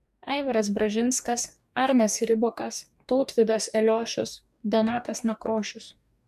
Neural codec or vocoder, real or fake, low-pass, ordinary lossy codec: codec, 44.1 kHz, 2.6 kbps, DAC; fake; 14.4 kHz; MP3, 96 kbps